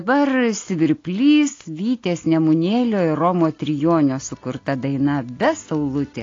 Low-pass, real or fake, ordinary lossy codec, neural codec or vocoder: 7.2 kHz; real; AAC, 32 kbps; none